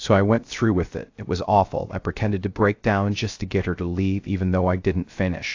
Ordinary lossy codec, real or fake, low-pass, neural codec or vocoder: AAC, 48 kbps; fake; 7.2 kHz; codec, 16 kHz, 0.3 kbps, FocalCodec